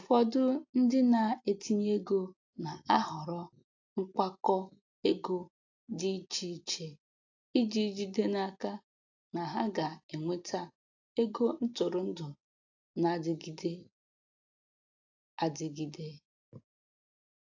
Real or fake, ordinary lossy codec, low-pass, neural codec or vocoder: real; AAC, 48 kbps; 7.2 kHz; none